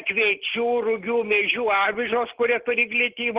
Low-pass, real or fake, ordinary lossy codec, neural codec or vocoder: 3.6 kHz; real; Opus, 16 kbps; none